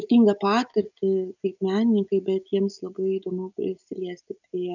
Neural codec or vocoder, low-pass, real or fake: none; 7.2 kHz; real